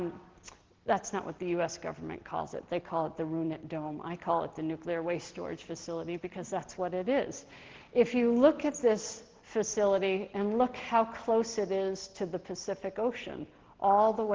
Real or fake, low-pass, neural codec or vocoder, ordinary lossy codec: real; 7.2 kHz; none; Opus, 16 kbps